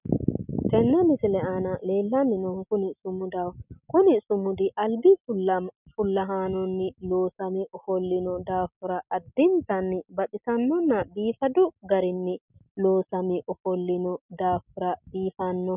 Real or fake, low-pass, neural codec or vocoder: real; 3.6 kHz; none